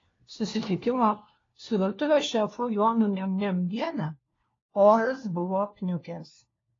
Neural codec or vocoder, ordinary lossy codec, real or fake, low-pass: codec, 16 kHz, 1 kbps, FunCodec, trained on LibriTTS, 50 frames a second; AAC, 32 kbps; fake; 7.2 kHz